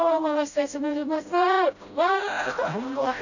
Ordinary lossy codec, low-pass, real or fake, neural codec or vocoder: none; 7.2 kHz; fake; codec, 16 kHz, 0.5 kbps, FreqCodec, smaller model